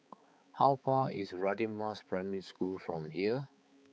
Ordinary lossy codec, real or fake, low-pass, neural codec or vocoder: none; fake; none; codec, 16 kHz, 4 kbps, X-Codec, HuBERT features, trained on balanced general audio